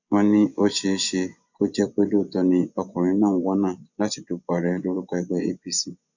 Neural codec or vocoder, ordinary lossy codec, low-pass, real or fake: vocoder, 24 kHz, 100 mel bands, Vocos; none; 7.2 kHz; fake